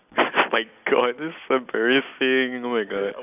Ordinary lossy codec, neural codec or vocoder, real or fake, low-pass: none; none; real; 3.6 kHz